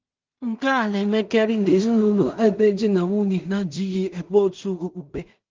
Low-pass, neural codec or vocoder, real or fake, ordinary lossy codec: 7.2 kHz; codec, 16 kHz in and 24 kHz out, 0.4 kbps, LongCat-Audio-Codec, two codebook decoder; fake; Opus, 24 kbps